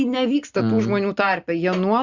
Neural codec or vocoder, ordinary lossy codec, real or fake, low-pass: none; Opus, 64 kbps; real; 7.2 kHz